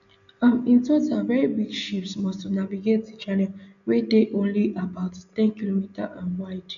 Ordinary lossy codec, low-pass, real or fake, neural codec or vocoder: none; 7.2 kHz; real; none